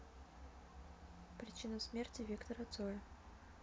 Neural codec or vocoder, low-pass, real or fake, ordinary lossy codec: none; none; real; none